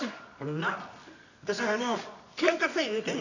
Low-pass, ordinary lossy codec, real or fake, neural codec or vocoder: 7.2 kHz; none; fake; codec, 24 kHz, 0.9 kbps, WavTokenizer, medium music audio release